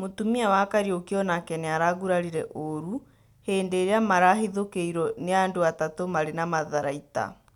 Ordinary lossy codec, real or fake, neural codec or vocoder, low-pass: none; real; none; 19.8 kHz